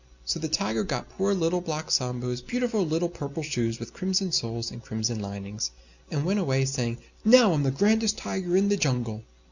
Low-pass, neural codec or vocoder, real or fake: 7.2 kHz; none; real